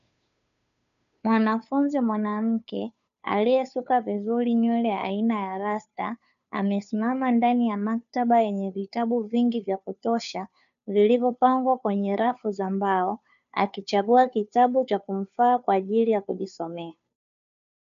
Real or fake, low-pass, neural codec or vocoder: fake; 7.2 kHz; codec, 16 kHz, 2 kbps, FunCodec, trained on Chinese and English, 25 frames a second